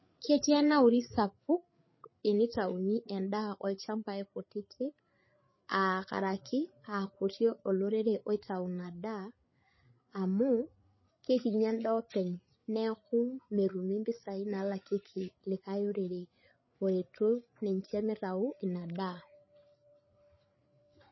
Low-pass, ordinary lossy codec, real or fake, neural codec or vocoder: 7.2 kHz; MP3, 24 kbps; fake; codec, 16 kHz, 8 kbps, FreqCodec, larger model